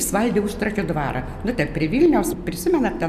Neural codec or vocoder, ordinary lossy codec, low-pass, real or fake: none; AAC, 96 kbps; 14.4 kHz; real